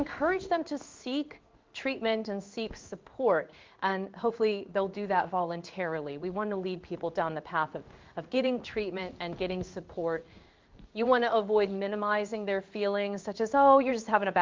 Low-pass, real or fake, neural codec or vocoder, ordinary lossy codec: 7.2 kHz; fake; codec, 16 kHz in and 24 kHz out, 1 kbps, XY-Tokenizer; Opus, 24 kbps